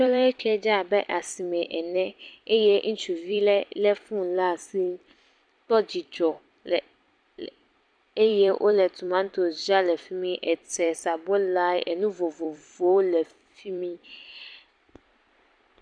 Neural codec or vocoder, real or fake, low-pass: vocoder, 44.1 kHz, 128 mel bands every 256 samples, BigVGAN v2; fake; 9.9 kHz